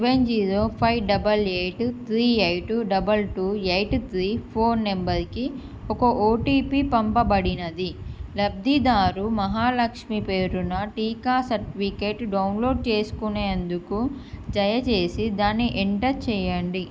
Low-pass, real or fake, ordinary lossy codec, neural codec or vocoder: none; real; none; none